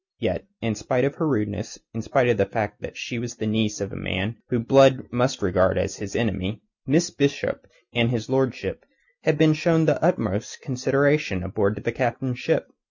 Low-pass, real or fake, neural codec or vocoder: 7.2 kHz; real; none